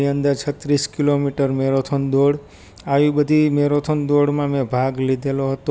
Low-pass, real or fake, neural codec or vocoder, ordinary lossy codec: none; real; none; none